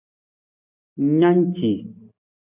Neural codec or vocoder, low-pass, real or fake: none; 3.6 kHz; real